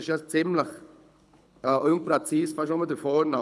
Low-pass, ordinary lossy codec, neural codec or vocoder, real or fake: none; none; codec, 24 kHz, 6 kbps, HILCodec; fake